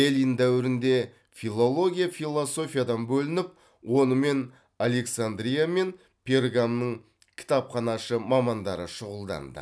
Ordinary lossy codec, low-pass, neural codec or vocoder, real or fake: none; none; none; real